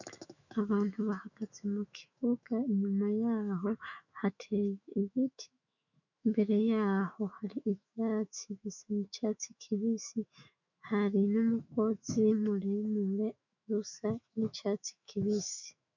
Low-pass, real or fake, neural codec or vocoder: 7.2 kHz; fake; autoencoder, 48 kHz, 128 numbers a frame, DAC-VAE, trained on Japanese speech